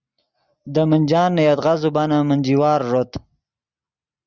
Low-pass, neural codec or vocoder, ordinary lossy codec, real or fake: 7.2 kHz; none; Opus, 64 kbps; real